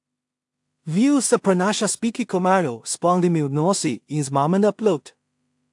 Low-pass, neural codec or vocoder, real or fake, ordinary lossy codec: 10.8 kHz; codec, 16 kHz in and 24 kHz out, 0.4 kbps, LongCat-Audio-Codec, two codebook decoder; fake; AAC, 64 kbps